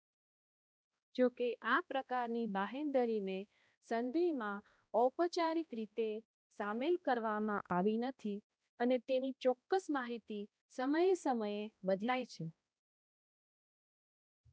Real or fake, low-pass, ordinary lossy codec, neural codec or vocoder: fake; none; none; codec, 16 kHz, 1 kbps, X-Codec, HuBERT features, trained on balanced general audio